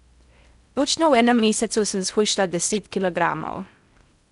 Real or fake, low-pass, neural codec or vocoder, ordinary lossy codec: fake; 10.8 kHz; codec, 16 kHz in and 24 kHz out, 0.6 kbps, FocalCodec, streaming, 4096 codes; none